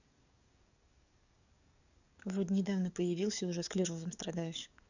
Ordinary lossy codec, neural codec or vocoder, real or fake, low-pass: none; codec, 44.1 kHz, 7.8 kbps, DAC; fake; 7.2 kHz